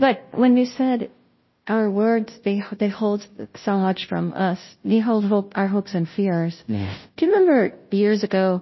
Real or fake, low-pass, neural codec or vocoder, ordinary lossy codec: fake; 7.2 kHz; codec, 16 kHz, 0.5 kbps, FunCodec, trained on Chinese and English, 25 frames a second; MP3, 24 kbps